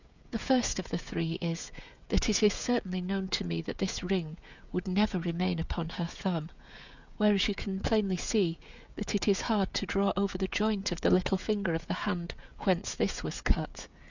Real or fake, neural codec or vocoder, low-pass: fake; codec, 16 kHz, 16 kbps, FreqCodec, smaller model; 7.2 kHz